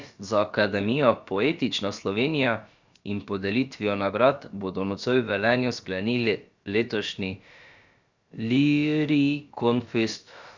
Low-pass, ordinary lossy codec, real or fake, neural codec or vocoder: 7.2 kHz; Opus, 64 kbps; fake; codec, 16 kHz, about 1 kbps, DyCAST, with the encoder's durations